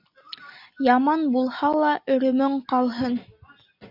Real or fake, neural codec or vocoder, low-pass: real; none; 5.4 kHz